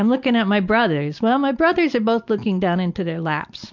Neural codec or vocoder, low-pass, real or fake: none; 7.2 kHz; real